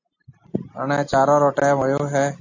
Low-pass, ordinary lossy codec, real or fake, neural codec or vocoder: 7.2 kHz; AAC, 48 kbps; real; none